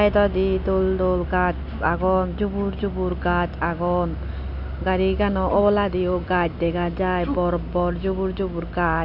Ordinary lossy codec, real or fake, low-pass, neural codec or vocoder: none; real; 5.4 kHz; none